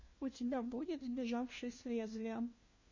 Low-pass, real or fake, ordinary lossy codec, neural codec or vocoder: 7.2 kHz; fake; MP3, 32 kbps; codec, 16 kHz, 1 kbps, FunCodec, trained on LibriTTS, 50 frames a second